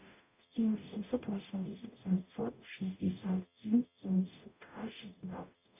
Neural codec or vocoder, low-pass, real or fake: codec, 44.1 kHz, 0.9 kbps, DAC; 3.6 kHz; fake